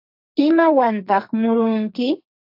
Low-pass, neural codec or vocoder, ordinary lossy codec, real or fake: 5.4 kHz; codec, 44.1 kHz, 2.6 kbps, SNAC; AAC, 48 kbps; fake